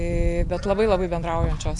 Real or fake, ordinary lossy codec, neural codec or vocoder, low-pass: real; AAC, 64 kbps; none; 10.8 kHz